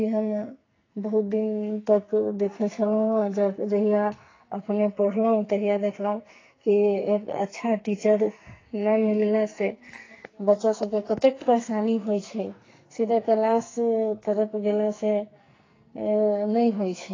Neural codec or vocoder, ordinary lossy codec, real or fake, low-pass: codec, 44.1 kHz, 2.6 kbps, SNAC; AAC, 32 kbps; fake; 7.2 kHz